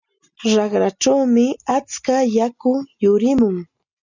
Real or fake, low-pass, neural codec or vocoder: real; 7.2 kHz; none